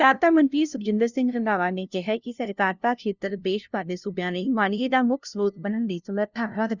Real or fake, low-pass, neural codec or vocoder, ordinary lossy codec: fake; 7.2 kHz; codec, 16 kHz, 0.5 kbps, FunCodec, trained on LibriTTS, 25 frames a second; none